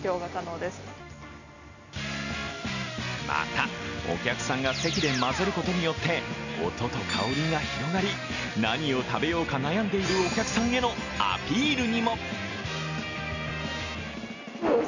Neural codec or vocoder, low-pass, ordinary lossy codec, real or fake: none; 7.2 kHz; none; real